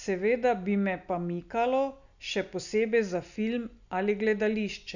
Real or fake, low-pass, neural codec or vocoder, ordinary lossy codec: real; 7.2 kHz; none; none